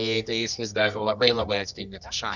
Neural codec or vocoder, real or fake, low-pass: codec, 24 kHz, 0.9 kbps, WavTokenizer, medium music audio release; fake; 7.2 kHz